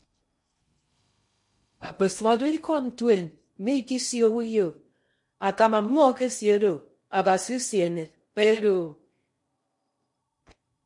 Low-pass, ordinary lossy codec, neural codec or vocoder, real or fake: 10.8 kHz; MP3, 48 kbps; codec, 16 kHz in and 24 kHz out, 0.6 kbps, FocalCodec, streaming, 2048 codes; fake